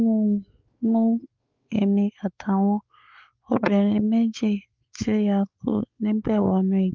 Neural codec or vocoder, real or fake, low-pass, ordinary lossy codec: codec, 16 kHz, 4 kbps, X-Codec, WavLM features, trained on Multilingual LibriSpeech; fake; 7.2 kHz; Opus, 32 kbps